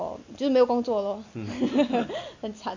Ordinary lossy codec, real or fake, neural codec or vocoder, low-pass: MP3, 48 kbps; real; none; 7.2 kHz